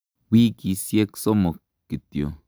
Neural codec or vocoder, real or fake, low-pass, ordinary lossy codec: none; real; none; none